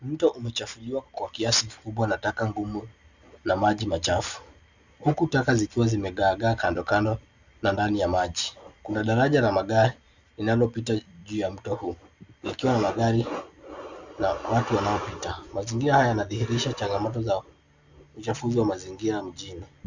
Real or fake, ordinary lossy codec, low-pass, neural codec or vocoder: real; Opus, 64 kbps; 7.2 kHz; none